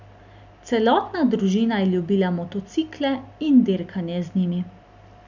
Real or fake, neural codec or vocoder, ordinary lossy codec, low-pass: real; none; none; 7.2 kHz